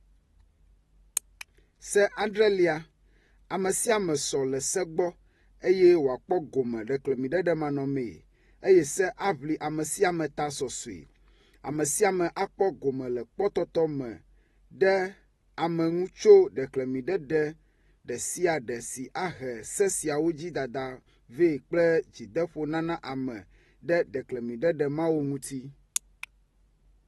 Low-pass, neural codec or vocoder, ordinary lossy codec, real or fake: 19.8 kHz; none; AAC, 32 kbps; real